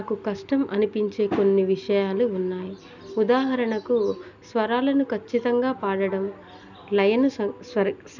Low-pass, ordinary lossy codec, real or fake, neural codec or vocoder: 7.2 kHz; none; real; none